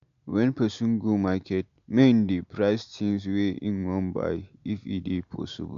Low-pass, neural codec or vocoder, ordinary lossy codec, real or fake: 7.2 kHz; none; AAC, 64 kbps; real